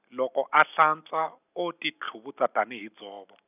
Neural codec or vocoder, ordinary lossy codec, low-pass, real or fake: none; none; 3.6 kHz; real